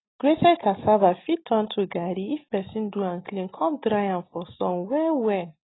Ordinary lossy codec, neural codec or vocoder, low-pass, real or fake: AAC, 16 kbps; none; 7.2 kHz; real